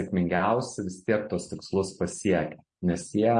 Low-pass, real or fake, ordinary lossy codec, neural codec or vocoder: 9.9 kHz; real; MP3, 48 kbps; none